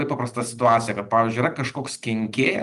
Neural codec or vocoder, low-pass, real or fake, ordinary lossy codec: none; 10.8 kHz; real; Opus, 32 kbps